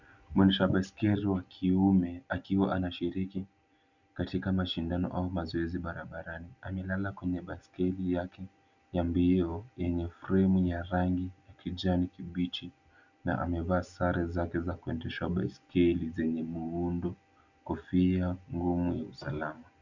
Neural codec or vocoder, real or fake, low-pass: none; real; 7.2 kHz